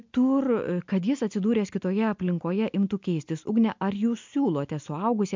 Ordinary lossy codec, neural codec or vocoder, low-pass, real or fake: MP3, 64 kbps; none; 7.2 kHz; real